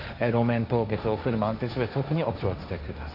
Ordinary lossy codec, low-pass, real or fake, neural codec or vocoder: none; 5.4 kHz; fake; codec, 16 kHz, 1.1 kbps, Voila-Tokenizer